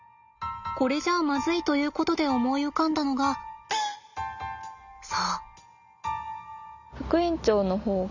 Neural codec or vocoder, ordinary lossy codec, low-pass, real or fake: none; none; 7.2 kHz; real